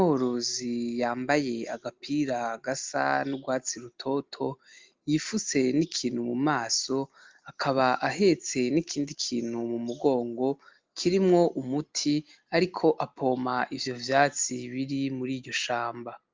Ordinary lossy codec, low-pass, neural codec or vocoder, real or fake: Opus, 32 kbps; 7.2 kHz; none; real